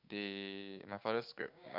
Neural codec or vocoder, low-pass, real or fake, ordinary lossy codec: codec, 16 kHz, 6 kbps, DAC; 5.4 kHz; fake; MP3, 48 kbps